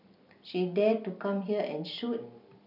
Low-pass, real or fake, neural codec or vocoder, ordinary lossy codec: 5.4 kHz; real; none; none